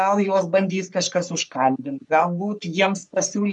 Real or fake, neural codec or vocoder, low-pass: fake; codec, 44.1 kHz, 3.4 kbps, Pupu-Codec; 10.8 kHz